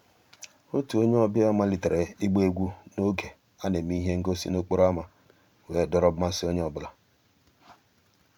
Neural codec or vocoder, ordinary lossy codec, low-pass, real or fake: vocoder, 44.1 kHz, 128 mel bands every 512 samples, BigVGAN v2; none; 19.8 kHz; fake